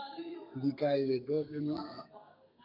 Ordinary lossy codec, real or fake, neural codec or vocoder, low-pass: MP3, 48 kbps; fake; codec, 32 kHz, 1.9 kbps, SNAC; 5.4 kHz